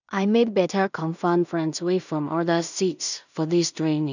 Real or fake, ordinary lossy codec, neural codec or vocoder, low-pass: fake; none; codec, 16 kHz in and 24 kHz out, 0.4 kbps, LongCat-Audio-Codec, two codebook decoder; 7.2 kHz